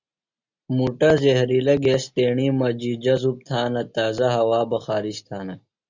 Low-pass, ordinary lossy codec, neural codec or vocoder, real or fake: 7.2 kHz; Opus, 64 kbps; none; real